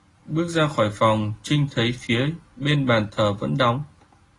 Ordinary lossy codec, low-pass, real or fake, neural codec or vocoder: AAC, 32 kbps; 10.8 kHz; real; none